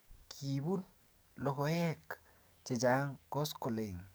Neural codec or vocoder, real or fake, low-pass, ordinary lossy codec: codec, 44.1 kHz, 7.8 kbps, DAC; fake; none; none